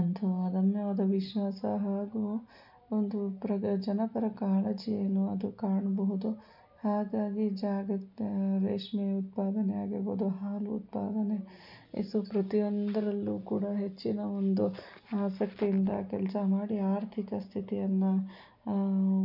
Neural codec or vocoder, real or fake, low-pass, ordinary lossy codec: none; real; 5.4 kHz; none